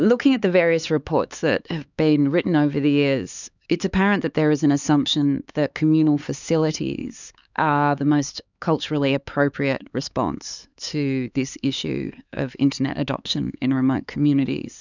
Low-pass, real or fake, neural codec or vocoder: 7.2 kHz; fake; codec, 16 kHz, 4 kbps, X-Codec, HuBERT features, trained on LibriSpeech